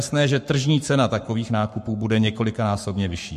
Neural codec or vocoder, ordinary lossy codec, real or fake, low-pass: codec, 44.1 kHz, 7.8 kbps, Pupu-Codec; MP3, 64 kbps; fake; 14.4 kHz